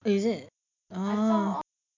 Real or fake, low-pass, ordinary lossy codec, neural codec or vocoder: real; 7.2 kHz; MP3, 64 kbps; none